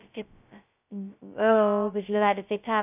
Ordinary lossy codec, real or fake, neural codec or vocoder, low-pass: none; fake; codec, 16 kHz, 0.2 kbps, FocalCodec; 3.6 kHz